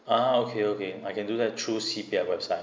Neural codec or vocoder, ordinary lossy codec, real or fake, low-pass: none; none; real; none